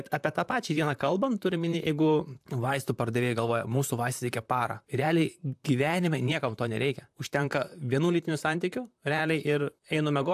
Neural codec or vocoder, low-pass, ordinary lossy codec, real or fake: vocoder, 44.1 kHz, 128 mel bands, Pupu-Vocoder; 14.4 kHz; AAC, 96 kbps; fake